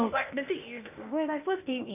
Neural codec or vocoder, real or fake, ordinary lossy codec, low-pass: codec, 16 kHz, 0.8 kbps, ZipCodec; fake; none; 3.6 kHz